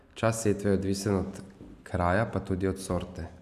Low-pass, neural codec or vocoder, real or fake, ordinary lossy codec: 14.4 kHz; none; real; none